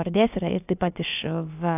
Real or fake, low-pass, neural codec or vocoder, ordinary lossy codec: fake; 3.6 kHz; codec, 16 kHz, about 1 kbps, DyCAST, with the encoder's durations; AAC, 32 kbps